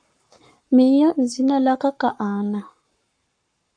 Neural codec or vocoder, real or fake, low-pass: codec, 44.1 kHz, 7.8 kbps, Pupu-Codec; fake; 9.9 kHz